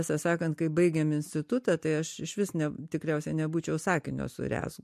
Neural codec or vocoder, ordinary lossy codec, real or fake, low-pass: none; MP3, 64 kbps; real; 14.4 kHz